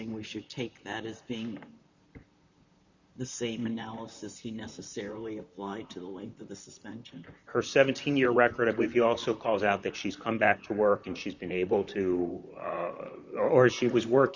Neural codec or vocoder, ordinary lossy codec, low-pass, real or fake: vocoder, 44.1 kHz, 128 mel bands, Pupu-Vocoder; Opus, 64 kbps; 7.2 kHz; fake